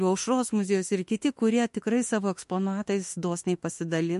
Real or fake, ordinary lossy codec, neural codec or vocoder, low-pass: fake; MP3, 48 kbps; autoencoder, 48 kHz, 32 numbers a frame, DAC-VAE, trained on Japanese speech; 14.4 kHz